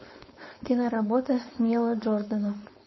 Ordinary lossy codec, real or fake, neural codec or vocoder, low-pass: MP3, 24 kbps; fake; codec, 16 kHz, 4.8 kbps, FACodec; 7.2 kHz